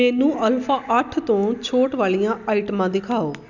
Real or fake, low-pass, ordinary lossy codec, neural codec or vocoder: real; 7.2 kHz; none; none